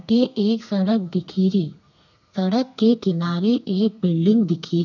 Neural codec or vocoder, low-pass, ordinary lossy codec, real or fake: codec, 32 kHz, 1.9 kbps, SNAC; 7.2 kHz; none; fake